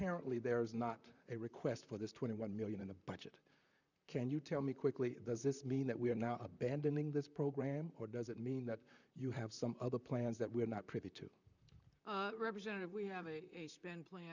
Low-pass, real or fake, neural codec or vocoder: 7.2 kHz; fake; vocoder, 44.1 kHz, 128 mel bands, Pupu-Vocoder